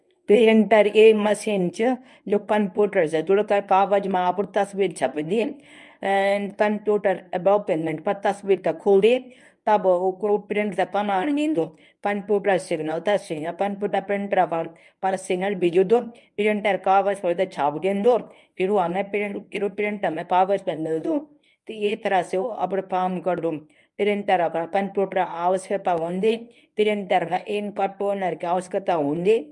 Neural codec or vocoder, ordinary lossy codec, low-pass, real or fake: codec, 24 kHz, 0.9 kbps, WavTokenizer, medium speech release version 1; none; none; fake